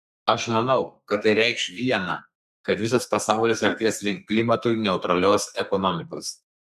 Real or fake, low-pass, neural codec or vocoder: fake; 14.4 kHz; codec, 32 kHz, 1.9 kbps, SNAC